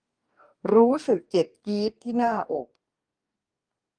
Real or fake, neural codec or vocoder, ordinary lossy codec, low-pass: fake; codec, 44.1 kHz, 2.6 kbps, DAC; Opus, 32 kbps; 9.9 kHz